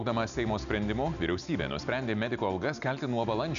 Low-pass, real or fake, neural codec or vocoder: 7.2 kHz; real; none